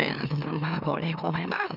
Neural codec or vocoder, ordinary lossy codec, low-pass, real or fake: autoencoder, 44.1 kHz, a latent of 192 numbers a frame, MeloTTS; none; 5.4 kHz; fake